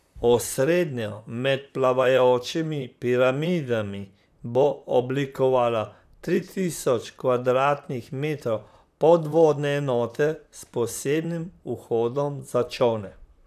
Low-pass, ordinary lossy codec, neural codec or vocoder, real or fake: 14.4 kHz; none; vocoder, 44.1 kHz, 128 mel bands, Pupu-Vocoder; fake